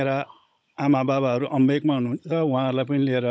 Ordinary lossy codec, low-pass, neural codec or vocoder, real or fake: none; none; codec, 16 kHz, 16 kbps, FunCodec, trained on Chinese and English, 50 frames a second; fake